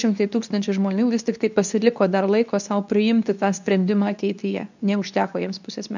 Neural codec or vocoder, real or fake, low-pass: codec, 24 kHz, 0.9 kbps, WavTokenizer, medium speech release version 2; fake; 7.2 kHz